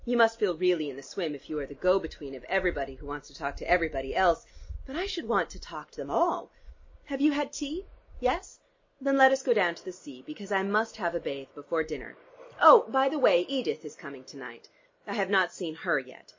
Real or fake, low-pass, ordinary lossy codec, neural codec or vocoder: real; 7.2 kHz; MP3, 32 kbps; none